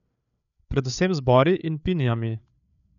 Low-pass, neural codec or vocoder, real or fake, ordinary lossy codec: 7.2 kHz; codec, 16 kHz, 8 kbps, FreqCodec, larger model; fake; none